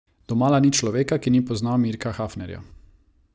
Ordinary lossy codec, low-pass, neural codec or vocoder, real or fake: none; none; none; real